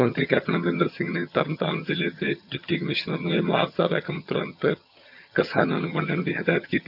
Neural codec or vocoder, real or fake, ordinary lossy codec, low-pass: vocoder, 22.05 kHz, 80 mel bands, HiFi-GAN; fake; none; 5.4 kHz